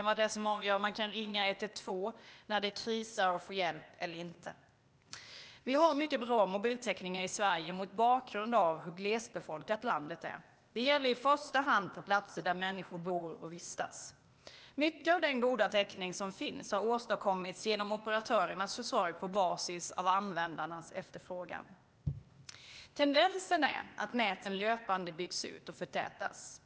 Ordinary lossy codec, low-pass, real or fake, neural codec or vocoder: none; none; fake; codec, 16 kHz, 0.8 kbps, ZipCodec